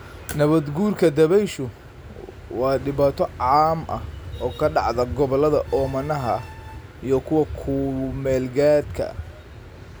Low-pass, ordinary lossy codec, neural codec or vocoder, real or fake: none; none; none; real